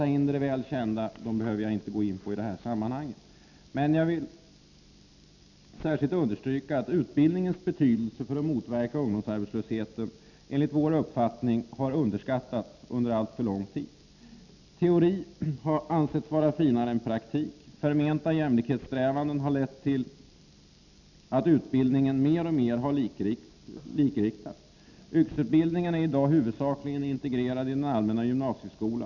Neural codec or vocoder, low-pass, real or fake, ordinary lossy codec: none; 7.2 kHz; real; none